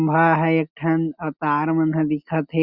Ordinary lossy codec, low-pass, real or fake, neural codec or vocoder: Opus, 64 kbps; 5.4 kHz; real; none